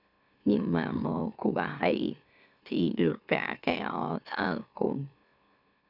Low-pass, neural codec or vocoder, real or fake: 5.4 kHz; autoencoder, 44.1 kHz, a latent of 192 numbers a frame, MeloTTS; fake